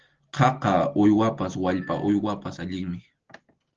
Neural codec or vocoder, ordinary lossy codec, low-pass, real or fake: none; Opus, 16 kbps; 7.2 kHz; real